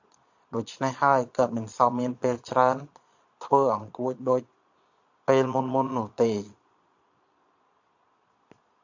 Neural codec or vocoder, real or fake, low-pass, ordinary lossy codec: vocoder, 22.05 kHz, 80 mel bands, Vocos; fake; 7.2 kHz; AAC, 48 kbps